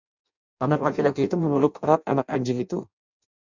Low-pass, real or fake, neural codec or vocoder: 7.2 kHz; fake; codec, 16 kHz in and 24 kHz out, 0.6 kbps, FireRedTTS-2 codec